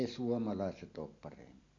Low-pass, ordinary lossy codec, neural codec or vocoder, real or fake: 7.2 kHz; AAC, 48 kbps; none; real